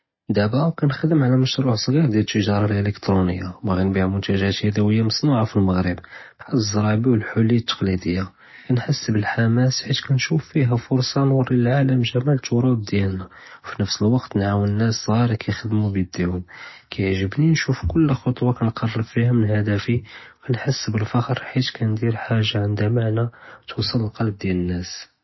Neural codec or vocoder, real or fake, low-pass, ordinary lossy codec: none; real; 7.2 kHz; MP3, 24 kbps